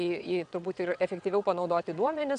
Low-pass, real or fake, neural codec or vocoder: 9.9 kHz; fake; vocoder, 22.05 kHz, 80 mel bands, WaveNeXt